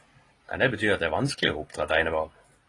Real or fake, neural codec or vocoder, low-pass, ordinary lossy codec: real; none; 10.8 kHz; AAC, 32 kbps